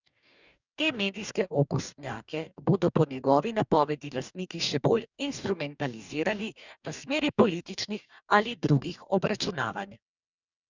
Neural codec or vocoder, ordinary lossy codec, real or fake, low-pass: codec, 44.1 kHz, 2.6 kbps, DAC; none; fake; 7.2 kHz